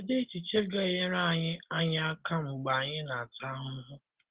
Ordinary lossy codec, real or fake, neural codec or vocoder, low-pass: Opus, 16 kbps; real; none; 3.6 kHz